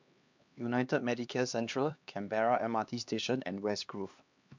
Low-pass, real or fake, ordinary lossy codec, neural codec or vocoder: 7.2 kHz; fake; MP3, 64 kbps; codec, 16 kHz, 2 kbps, X-Codec, HuBERT features, trained on LibriSpeech